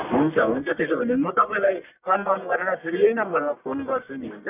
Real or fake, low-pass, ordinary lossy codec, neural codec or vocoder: fake; 3.6 kHz; none; codec, 44.1 kHz, 1.7 kbps, Pupu-Codec